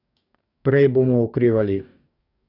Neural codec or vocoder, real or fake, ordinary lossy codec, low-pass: codec, 44.1 kHz, 2.6 kbps, DAC; fake; none; 5.4 kHz